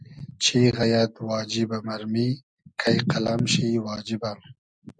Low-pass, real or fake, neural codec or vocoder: 9.9 kHz; real; none